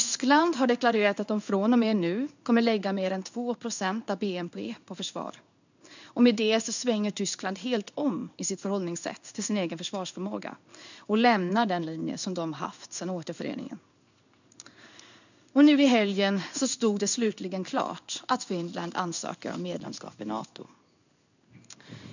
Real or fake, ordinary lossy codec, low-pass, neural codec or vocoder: fake; none; 7.2 kHz; codec, 16 kHz in and 24 kHz out, 1 kbps, XY-Tokenizer